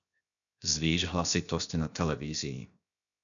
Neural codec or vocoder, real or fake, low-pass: codec, 16 kHz, 0.7 kbps, FocalCodec; fake; 7.2 kHz